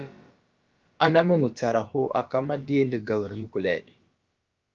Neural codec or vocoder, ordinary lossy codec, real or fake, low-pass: codec, 16 kHz, about 1 kbps, DyCAST, with the encoder's durations; Opus, 24 kbps; fake; 7.2 kHz